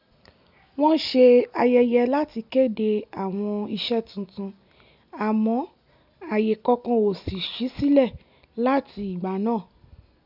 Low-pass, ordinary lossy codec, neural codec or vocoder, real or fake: 5.4 kHz; none; none; real